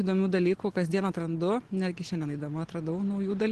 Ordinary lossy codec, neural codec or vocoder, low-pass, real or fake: Opus, 16 kbps; none; 10.8 kHz; real